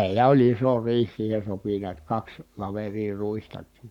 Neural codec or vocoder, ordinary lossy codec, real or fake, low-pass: codec, 44.1 kHz, 7.8 kbps, Pupu-Codec; none; fake; 19.8 kHz